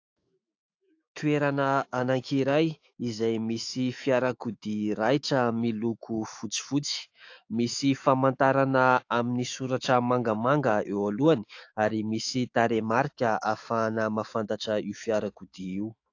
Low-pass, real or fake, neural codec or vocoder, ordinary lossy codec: 7.2 kHz; fake; autoencoder, 48 kHz, 128 numbers a frame, DAC-VAE, trained on Japanese speech; AAC, 48 kbps